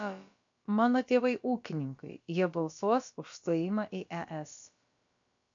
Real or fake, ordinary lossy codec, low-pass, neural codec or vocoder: fake; MP3, 64 kbps; 7.2 kHz; codec, 16 kHz, about 1 kbps, DyCAST, with the encoder's durations